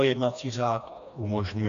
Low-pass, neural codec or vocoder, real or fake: 7.2 kHz; codec, 16 kHz, 2 kbps, FreqCodec, smaller model; fake